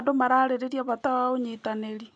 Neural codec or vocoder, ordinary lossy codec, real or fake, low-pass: none; none; real; 9.9 kHz